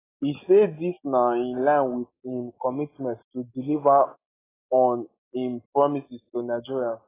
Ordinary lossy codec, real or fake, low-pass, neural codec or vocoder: AAC, 16 kbps; real; 3.6 kHz; none